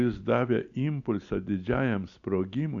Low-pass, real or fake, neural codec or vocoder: 7.2 kHz; real; none